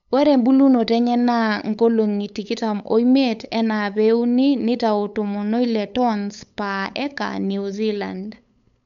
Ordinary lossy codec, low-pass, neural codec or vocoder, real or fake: none; 7.2 kHz; codec, 16 kHz, 8 kbps, FunCodec, trained on LibriTTS, 25 frames a second; fake